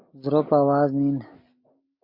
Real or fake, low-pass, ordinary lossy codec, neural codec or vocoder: real; 5.4 kHz; MP3, 32 kbps; none